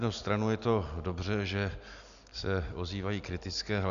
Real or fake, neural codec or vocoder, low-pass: real; none; 7.2 kHz